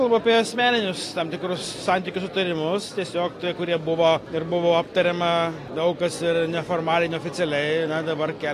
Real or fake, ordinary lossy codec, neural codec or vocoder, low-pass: real; AAC, 48 kbps; none; 14.4 kHz